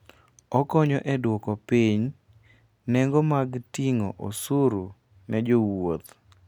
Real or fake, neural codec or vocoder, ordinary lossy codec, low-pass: real; none; none; 19.8 kHz